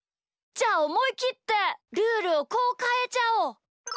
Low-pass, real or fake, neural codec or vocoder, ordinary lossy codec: none; real; none; none